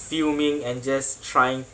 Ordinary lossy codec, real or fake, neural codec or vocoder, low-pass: none; real; none; none